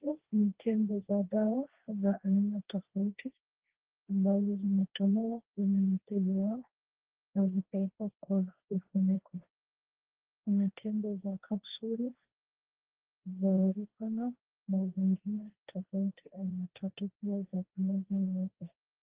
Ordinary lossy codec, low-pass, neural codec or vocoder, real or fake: Opus, 16 kbps; 3.6 kHz; codec, 16 kHz, 1.1 kbps, Voila-Tokenizer; fake